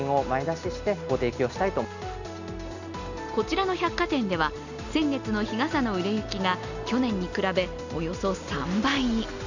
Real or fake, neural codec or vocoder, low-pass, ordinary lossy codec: real; none; 7.2 kHz; none